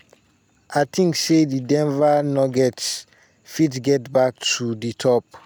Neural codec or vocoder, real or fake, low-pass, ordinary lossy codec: none; real; none; none